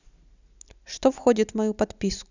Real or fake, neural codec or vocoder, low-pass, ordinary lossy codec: real; none; 7.2 kHz; none